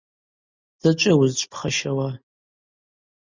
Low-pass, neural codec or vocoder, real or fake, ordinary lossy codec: 7.2 kHz; none; real; Opus, 64 kbps